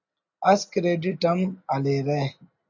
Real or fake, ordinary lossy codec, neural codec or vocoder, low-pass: real; AAC, 48 kbps; none; 7.2 kHz